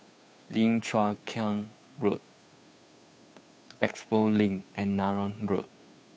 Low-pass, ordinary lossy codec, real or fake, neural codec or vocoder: none; none; fake; codec, 16 kHz, 2 kbps, FunCodec, trained on Chinese and English, 25 frames a second